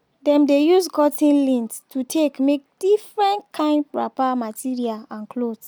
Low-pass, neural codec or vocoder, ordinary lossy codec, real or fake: none; none; none; real